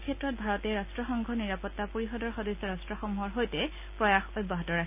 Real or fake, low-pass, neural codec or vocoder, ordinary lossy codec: real; 3.6 kHz; none; none